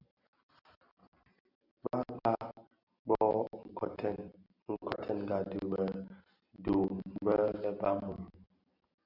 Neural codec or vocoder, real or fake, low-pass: none; real; 5.4 kHz